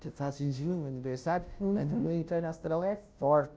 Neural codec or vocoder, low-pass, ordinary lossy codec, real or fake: codec, 16 kHz, 0.5 kbps, FunCodec, trained on Chinese and English, 25 frames a second; none; none; fake